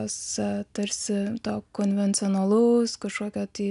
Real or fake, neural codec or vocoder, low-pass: real; none; 10.8 kHz